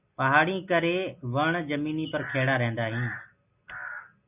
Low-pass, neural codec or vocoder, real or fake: 3.6 kHz; none; real